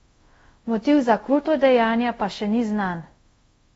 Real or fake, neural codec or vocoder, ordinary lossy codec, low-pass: fake; codec, 24 kHz, 0.5 kbps, DualCodec; AAC, 24 kbps; 10.8 kHz